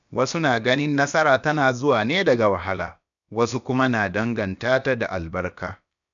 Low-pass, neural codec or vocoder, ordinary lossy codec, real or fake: 7.2 kHz; codec, 16 kHz, about 1 kbps, DyCAST, with the encoder's durations; none; fake